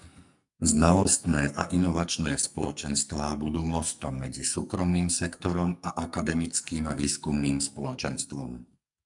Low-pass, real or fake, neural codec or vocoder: 10.8 kHz; fake; codec, 44.1 kHz, 2.6 kbps, SNAC